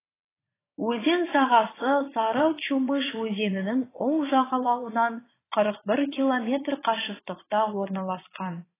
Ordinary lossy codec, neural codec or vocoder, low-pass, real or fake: AAC, 16 kbps; vocoder, 22.05 kHz, 80 mel bands, Vocos; 3.6 kHz; fake